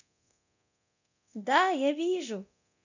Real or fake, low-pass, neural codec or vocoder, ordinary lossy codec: fake; 7.2 kHz; codec, 24 kHz, 0.9 kbps, DualCodec; none